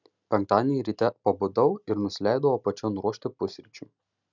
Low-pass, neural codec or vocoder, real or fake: 7.2 kHz; none; real